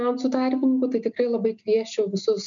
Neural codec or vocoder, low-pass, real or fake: none; 7.2 kHz; real